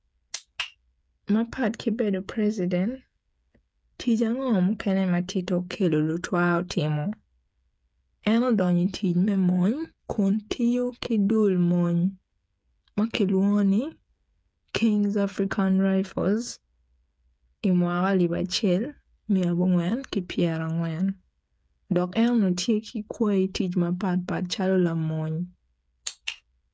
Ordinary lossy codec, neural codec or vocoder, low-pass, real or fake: none; codec, 16 kHz, 8 kbps, FreqCodec, smaller model; none; fake